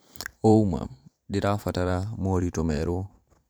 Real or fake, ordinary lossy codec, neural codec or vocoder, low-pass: fake; none; vocoder, 44.1 kHz, 128 mel bands every 512 samples, BigVGAN v2; none